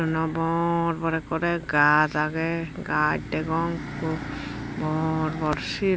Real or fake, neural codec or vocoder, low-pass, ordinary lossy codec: real; none; none; none